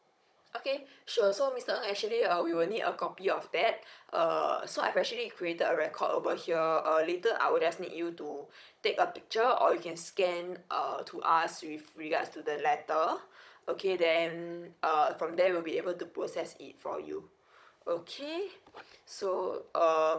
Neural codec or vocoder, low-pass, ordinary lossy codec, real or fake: codec, 16 kHz, 16 kbps, FunCodec, trained on Chinese and English, 50 frames a second; none; none; fake